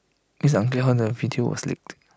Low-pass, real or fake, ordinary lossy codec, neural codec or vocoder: none; real; none; none